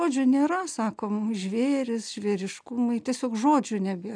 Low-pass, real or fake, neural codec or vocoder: 9.9 kHz; real; none